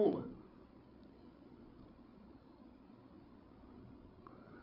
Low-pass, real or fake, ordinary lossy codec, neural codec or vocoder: 5.4 kHz; fake; none; codec, 16 kHz, 8 kbps, FreqCodec, larger model